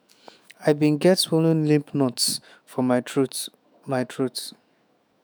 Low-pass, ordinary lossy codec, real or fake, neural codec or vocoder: none; none; fake; autoencoder, 48 kHz, 128 numbers a frame, DAC-VAE, trained on Japanese speech